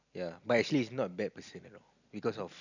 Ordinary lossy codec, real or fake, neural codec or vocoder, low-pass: none; real; none; 7.2 kHz